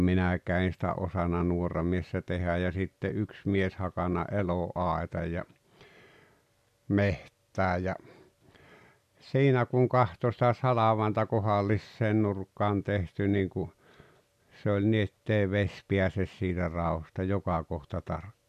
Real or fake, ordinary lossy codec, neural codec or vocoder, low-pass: real; none; none; 14.4 kHz